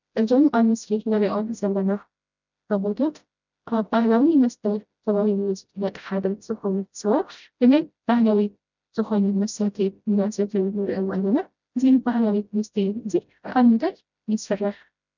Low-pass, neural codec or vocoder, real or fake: 7.2 kHz; codec, 16 kHz, 0.5 kbps, FreqCodec, smaller model; fake